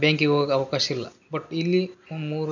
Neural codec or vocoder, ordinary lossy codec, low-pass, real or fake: none; none; 7.2 kHz; real